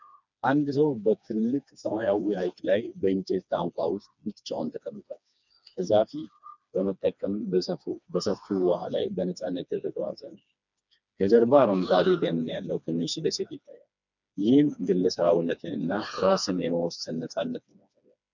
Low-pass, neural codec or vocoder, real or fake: 7.2 kHz; codec, 16 kHz, 2 kbps, FreqCodec, smaller model; fake